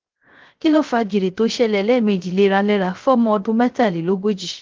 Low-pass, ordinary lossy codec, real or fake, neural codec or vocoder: 7.2 kHz; Opus, 16 kbps; fake; codec, 16 kHz, 0.3 kbps, FocalCodec